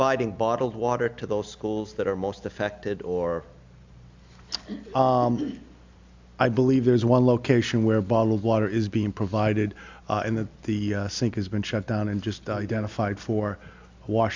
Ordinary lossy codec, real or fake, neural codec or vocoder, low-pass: MP3, 64 kbps; real; none; 7.2 kHz